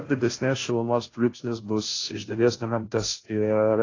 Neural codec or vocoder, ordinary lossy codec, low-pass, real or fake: codec, 16 kHz, 0.5 kbps, FunCodec, trained on Chinese and English, 25 frames a second; AAC, 32 kbps; 7.2 kHz; fake